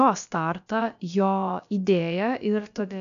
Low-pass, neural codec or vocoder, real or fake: 7.2 kHz; codec, 16 kHz, about 1 kbps, DyCAST, with the encoder's durations; fake